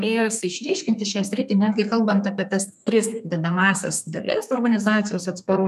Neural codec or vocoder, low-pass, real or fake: codec, 32 kHz, 1.9 kbps, SNAC; 14.4 kHz; fake